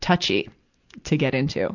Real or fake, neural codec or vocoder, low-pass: real; none; 7.2 kHz